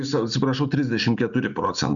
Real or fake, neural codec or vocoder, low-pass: real; none; 7.2 kHz